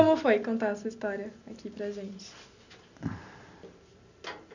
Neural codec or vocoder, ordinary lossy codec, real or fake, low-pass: none; none; real; 7.2 kHz